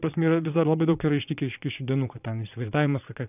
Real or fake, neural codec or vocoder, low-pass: fake; vocoder, 22.05 kHz, 80 mel bands, Vocos; 3.6 kHz